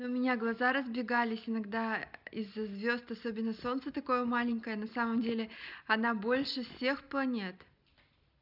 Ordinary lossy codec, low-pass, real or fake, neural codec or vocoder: none; 5.4 kHz; real; none